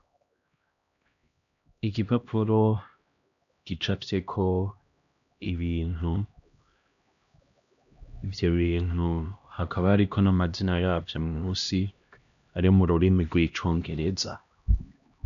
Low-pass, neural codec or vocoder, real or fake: 7.2 kHz; codec, 16 kHz, 1 kbps, X-Codec, HuBERT features, trained on LibriSpeech; fake